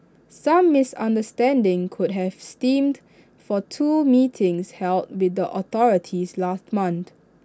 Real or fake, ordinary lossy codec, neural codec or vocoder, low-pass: real; none; none; none